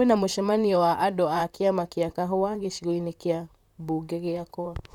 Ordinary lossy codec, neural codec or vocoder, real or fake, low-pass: none; vocoder, 44.1 kHz, 128 mel bands, Pupu-Vocoder; fake; 19.8 kHz